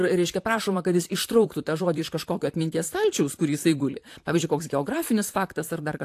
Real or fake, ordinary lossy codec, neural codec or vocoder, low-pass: fake; AAC, 64 kbps; vocoder, 44.1 kHz, 128 mel bands, Pupu-Vocoder; 14.4 kHz